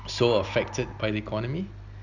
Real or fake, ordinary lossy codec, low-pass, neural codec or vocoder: real; none; 7.2 kHz; none